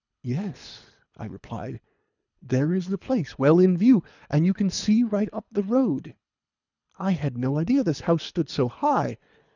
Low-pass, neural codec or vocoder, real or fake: 7.2 kHz; codec, 24 kHz, 3 kbps, HILCodec; fake